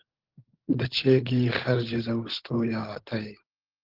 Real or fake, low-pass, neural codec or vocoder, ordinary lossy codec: fake; 5.4 kHz; codec, 16 kHz, 16 kbps, FunCodec, trained on LibriTTS, 50 frames a second; Opus, 16 kbps